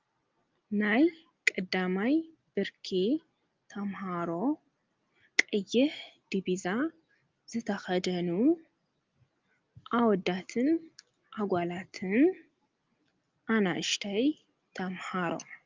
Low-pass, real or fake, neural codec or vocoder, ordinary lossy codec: 7.2 kHz; real; none; Opus, 32 kbps